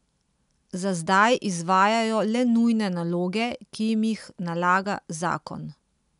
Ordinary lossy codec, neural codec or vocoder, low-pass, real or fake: none; none; 10.8 kHz; real